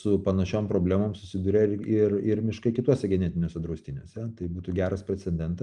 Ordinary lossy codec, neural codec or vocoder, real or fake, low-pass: Opus, 32 kbps; none; real; 10.8 kHz